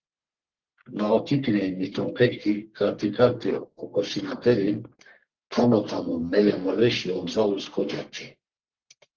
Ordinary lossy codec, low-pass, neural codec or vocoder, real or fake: Opus, 16 kbps; 7.2 kHz; codec, 44.1 kHz, 1.7 kbps, Pupu-Codec; fake